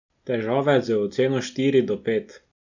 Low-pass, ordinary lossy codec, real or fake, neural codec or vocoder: 7.2 kHz; none; real; none